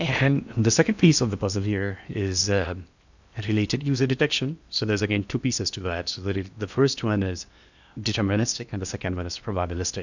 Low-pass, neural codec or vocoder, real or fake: 7.2 kHz; codec, 16 kHz in and 24 kHz out, 0.8 kbps, FocalCodec, streaming, 65536 codes; fake